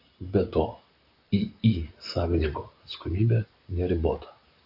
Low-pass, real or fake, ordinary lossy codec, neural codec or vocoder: 5.4 kHz; fake; AAC, 48 kbps; vocoder, 44.1 kHz, 128 mel bands, Pupu-Vocoder